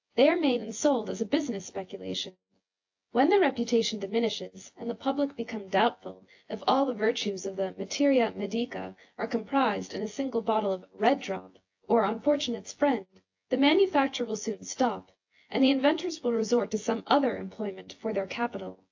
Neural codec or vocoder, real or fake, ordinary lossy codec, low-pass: vocoder, 24 kHz, 100 mel bands, Vocos; fake; AAC, 48 kbps; 7.2 kHz